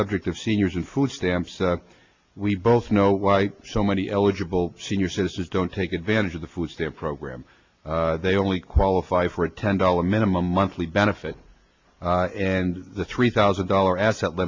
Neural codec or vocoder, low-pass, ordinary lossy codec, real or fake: none; 7.2 kHz; MP3, 64 kbps; real